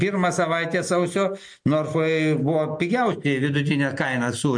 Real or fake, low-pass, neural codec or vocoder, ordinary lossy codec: real; 9.9 kHz; none; MP3, 48 kbps